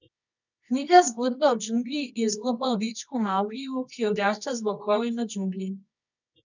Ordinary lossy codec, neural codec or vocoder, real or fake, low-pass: none; codec, 24 kHz, 0.9 kbps, WavTokenizer, medium music audio release; fake; 7.2 kHz